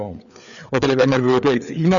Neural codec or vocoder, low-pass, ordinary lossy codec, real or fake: codec, 16 kHz, 4 kbps, FreqCodec, larger model; 7.2 kHz; MP3, 96 kbps; fake